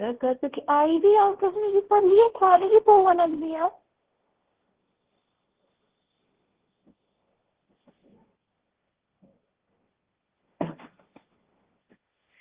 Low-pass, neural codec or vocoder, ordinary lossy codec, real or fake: 3.6 kHz; codec, 16 kHz, 1.1 kbps, Voila-Tokenizer; Opus, 16 kbps; fake